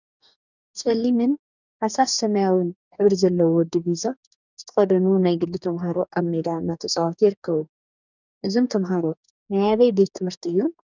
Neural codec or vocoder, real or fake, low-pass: codec, 44.1 kHz, 2.6 kbps, DAC; fake; 7.2 kHz